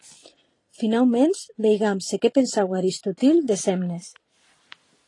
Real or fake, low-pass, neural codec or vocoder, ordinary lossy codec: fake; 10.8 kHz; vocoder, 44.1 kHz, 128 mel bands every 512 samples, BigVGAN v2; AAC, 32 kbps